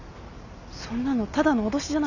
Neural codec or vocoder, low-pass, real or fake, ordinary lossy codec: none; 7.2 kHz; real; none